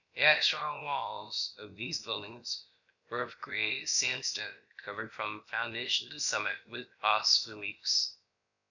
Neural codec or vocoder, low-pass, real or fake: codec, 16 kHz, about 1 kbps, DyCAST, with the encoder's durations; 7.2 kHz; fake